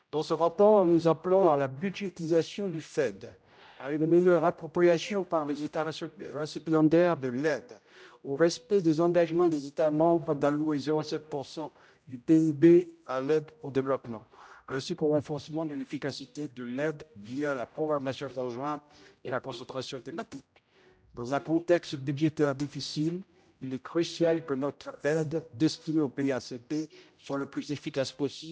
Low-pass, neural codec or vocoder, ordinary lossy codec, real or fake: none; codec, 16 kHz, 0.5 kbps, X-Codec, HuBERT features, trained on general audio; none; fake